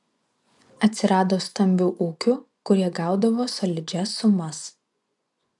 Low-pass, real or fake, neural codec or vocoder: 10.8 kHz; fake; vocoder, 24 kHz, 100 mel bands, Vocos